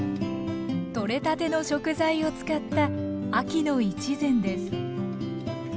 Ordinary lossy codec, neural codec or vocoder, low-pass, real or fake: none; none; none; real